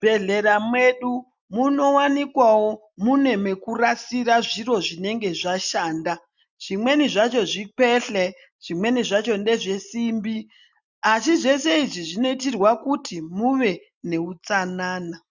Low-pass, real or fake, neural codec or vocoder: 7.2 kHz; real; none